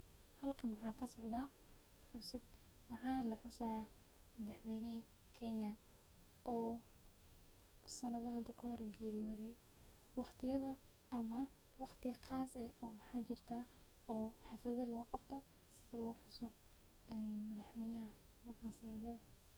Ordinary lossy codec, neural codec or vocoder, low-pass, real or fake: none; codec, 44.1 kHz, 2.6 kbps, DAC; none; fake